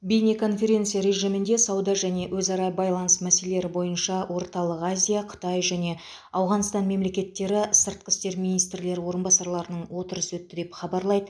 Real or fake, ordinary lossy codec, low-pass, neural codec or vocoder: real; none; none; none